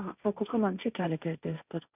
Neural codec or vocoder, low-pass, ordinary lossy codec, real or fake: codec, 16 kHz, 1.1 kbps, Voila-Tokenizer; 3.6 kHz; none; fake